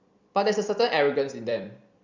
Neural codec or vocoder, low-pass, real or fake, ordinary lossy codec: vocoder, 44.1 kHz, 128 mel bands every 256 samples, BigVGAN v2; 7.2 kHz; fake; Opus, 64 kbps